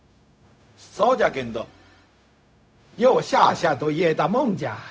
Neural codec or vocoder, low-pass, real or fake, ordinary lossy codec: codec, 16 kHz, 0.4 kbps, LongCat-Audio-Codec; none; fake; none